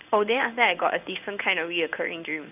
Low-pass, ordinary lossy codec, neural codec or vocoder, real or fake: 3.6 kHz; none; codec, 16 kHz in and 24 kHz out, 1 kbps, XY-Tokenizer; fake